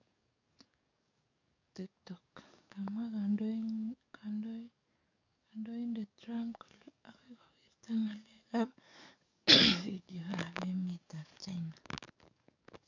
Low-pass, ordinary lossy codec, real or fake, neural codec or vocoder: 7.2 kHz; none; fake; codec, 44.1 kHz, 7.8 kbps, DAC